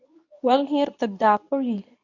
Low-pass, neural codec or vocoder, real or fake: 7.2 kHz; codec, 24 kHz, 0.9 kbps, WavTokenizer, medium speech release version 2; fake